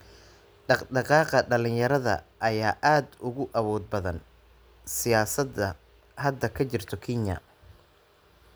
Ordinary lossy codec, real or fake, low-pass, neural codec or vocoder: none; real; none; none